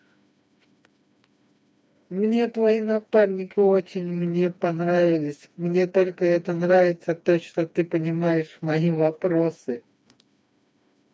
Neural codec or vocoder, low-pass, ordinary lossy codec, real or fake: codec, 16 kHz, 2 kbps, FreqCodec, smaller model; none; none; fake